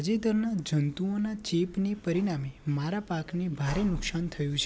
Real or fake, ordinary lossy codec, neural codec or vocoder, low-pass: real; none; none; none